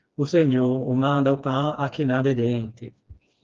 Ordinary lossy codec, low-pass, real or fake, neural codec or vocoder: Opus, 32 kbps; 7.2 kHz; fake; codec, 16 kHz, 2 kbps, FreqCodec, smaller model